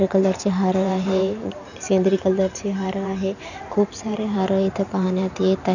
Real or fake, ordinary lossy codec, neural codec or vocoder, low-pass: fake; none; vocoder, 44.1 kHz, 128 mel bands every 512 samples, BigVGAN v2; 7.2 kHz